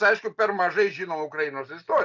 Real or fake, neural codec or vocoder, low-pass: real; none; 7.2 kHz